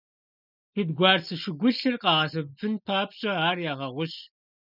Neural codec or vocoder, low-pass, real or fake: none; 5.4 kHz; real